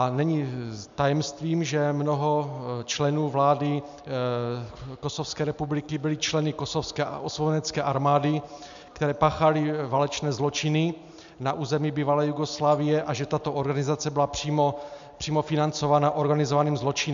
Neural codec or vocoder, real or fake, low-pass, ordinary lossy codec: none; real; 7.2 kHz; MP3, 64 kbps